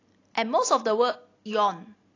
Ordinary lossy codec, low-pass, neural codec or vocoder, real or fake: AAC, 32 kbps; 7.2 kHz; none; real